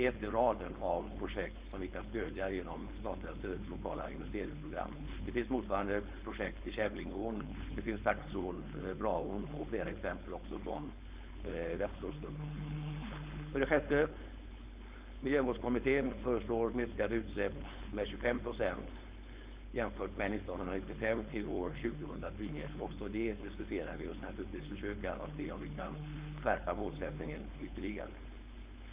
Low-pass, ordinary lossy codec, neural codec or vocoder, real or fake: 3.6 kHz; Opus, 64 kbps; codec, 16 kHz, 4.8 kbps, FACodec; fake